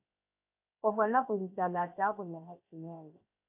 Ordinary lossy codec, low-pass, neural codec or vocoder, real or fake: AAC, 24 kbps; 3.6 kHz; codec, 16 kHz, 0.7 kbps, FocalCodec; fake